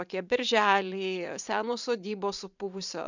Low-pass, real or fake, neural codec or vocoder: 7.2 kHz; fake; vocoder, 44.1 kHz, 128 mel bands every 512 samples, BigVGAN v2